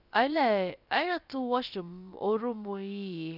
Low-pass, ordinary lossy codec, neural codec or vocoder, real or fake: 5.4 kHz; none; codec, 16 kHz, about 1 kbps, DyCAST, with the encoder's durations; fake